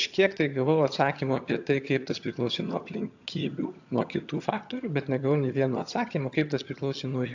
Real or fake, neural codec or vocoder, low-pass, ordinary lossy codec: fake; vocoder, 22.05 kHz, 80 mel bands, HiFi-GAN; 7.2 kHz; AAC, 48 kbps